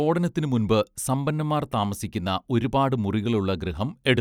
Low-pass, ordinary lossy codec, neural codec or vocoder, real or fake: 19.8 kHz; none; none; real